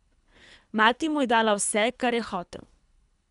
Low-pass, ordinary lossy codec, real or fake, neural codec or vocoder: 10.8 kHz; none; fake; codec, 24 kHz, 3 kbps, HILCodec